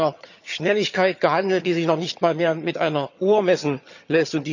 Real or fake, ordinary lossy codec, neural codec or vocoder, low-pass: fake; none; vocoder, 22.05 kHz, 80 mel bands, HiFi-GAN; 7.2 kHz